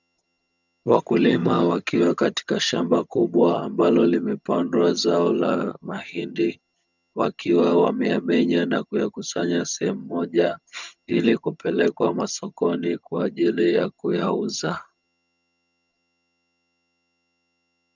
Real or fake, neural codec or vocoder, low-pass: fake; vocoder, 22.05 kHz, 80 mel bands, HiFi-GAN; 7.2 kHz